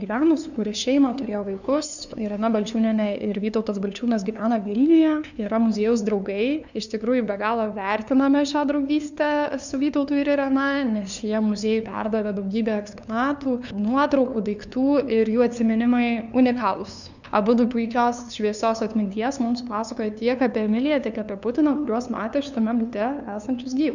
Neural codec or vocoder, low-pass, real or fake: codec, 16 kHz, 2 kbps, FunCodec, trained on LibriTTS, 25 frames a second; 7.2 kHz; fake